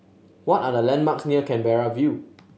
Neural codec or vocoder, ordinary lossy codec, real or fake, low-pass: none; none; real; none